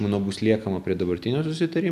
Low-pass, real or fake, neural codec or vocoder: 14.4 kHz; real; none